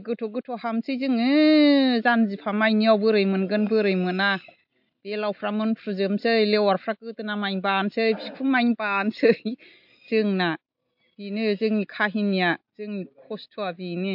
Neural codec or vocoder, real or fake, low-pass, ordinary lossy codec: none; real; 5.4 kHz; MP3, 48 kbps